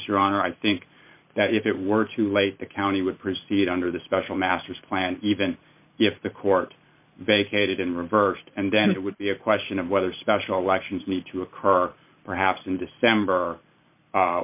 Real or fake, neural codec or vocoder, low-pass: fake; vocoder, 44.1 kHz, 128 mel bands every 512 samples, BigVGAN v2; 3.6 kHz